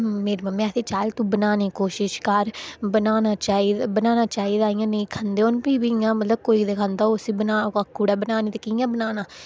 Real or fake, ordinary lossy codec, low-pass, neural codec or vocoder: real; none; none; none